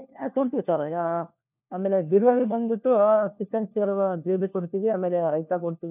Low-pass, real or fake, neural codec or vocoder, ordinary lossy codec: 3.6 kHz; fake; codec, 16 kHz, 1 kbps, FunCodec, trained on LibriTTS, 50 frames a second; none